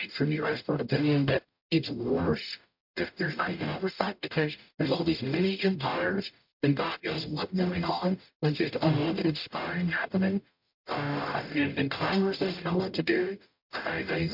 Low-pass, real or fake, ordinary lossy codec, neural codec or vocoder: 5.4 kHz; fake; MP3, 48 kbps; codec, 44.1 kHz, 0.9 kbps, DAC